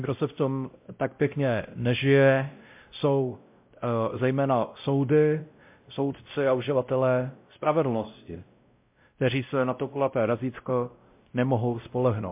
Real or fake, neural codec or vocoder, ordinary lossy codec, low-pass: fake; codec, 16 kHz, 0.5 kbps, X-Codec, WavLM features, trained on Multilingual LibriSpeech; MP3, 32 kbps; 3.6 kHz